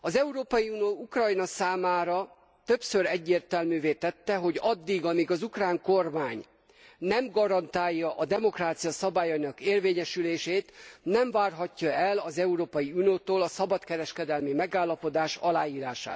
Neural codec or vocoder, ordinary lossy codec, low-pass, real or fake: none; none; none; real